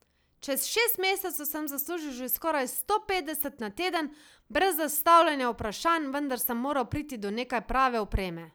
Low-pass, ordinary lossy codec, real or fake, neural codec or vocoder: none; none; real; none